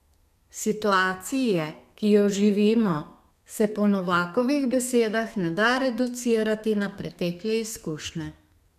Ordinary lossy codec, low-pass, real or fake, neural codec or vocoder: none; 14.4 kHz; fake; codec, 32 kHz, 1.9 kbps, SNAC